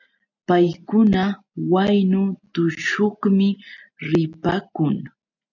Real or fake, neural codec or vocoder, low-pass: real; none; 7.2 kHz